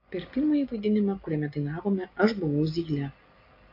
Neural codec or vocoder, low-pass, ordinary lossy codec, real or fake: none; 5.4 kHz; AAC, 32 kbps; real